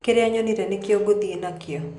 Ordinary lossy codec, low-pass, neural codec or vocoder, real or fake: none; 10.8 kHz; none; real